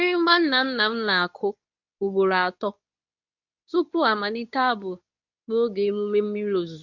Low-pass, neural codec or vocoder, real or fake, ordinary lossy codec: 7.2 kHz; codec, 24 kHz, 0.9 kbps, WavTokenizer, medium speech release version 2; fake; none